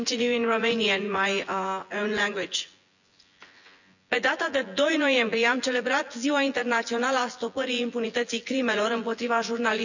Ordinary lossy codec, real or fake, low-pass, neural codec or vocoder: none; fake; 7.2 kHz; vocoder, 24 kHz, 100 mel bands, Vocos